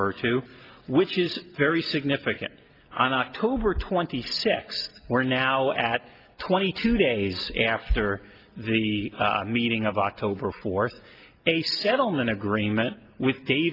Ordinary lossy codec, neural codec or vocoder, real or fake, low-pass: Opus, 24 kbps; none; real; 5.4 kHz